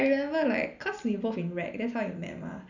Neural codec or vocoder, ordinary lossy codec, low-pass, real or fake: none; none; 7.2 kHz; real